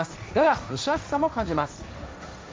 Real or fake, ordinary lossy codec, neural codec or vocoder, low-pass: fake; none; codec, 16 kHz, 1.1 kbps, Voila-Tokenizer; none